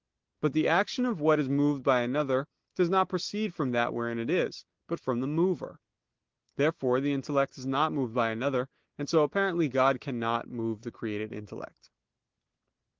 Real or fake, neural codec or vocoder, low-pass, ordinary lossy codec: real; none; 7.2 kHz; Opus, 16 kbps